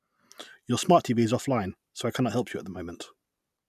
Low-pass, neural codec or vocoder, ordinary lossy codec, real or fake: 14.4 kHz; none; none; real